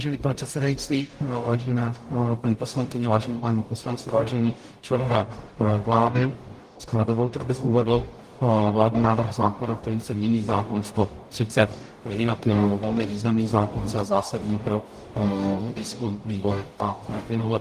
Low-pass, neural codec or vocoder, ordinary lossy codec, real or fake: 14.4 kHz; codec, 44.1 kHz, 0.9 kbps, DAC; Opus, 24 kbps; fake